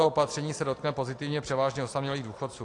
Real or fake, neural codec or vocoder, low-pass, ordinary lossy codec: real; none; 10.8 kHz; AAC, 48 kbps